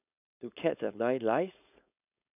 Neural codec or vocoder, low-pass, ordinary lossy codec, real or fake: codec, 16 kHz, 4.8 kbps, FACodec; 3.6 kHz; none; fake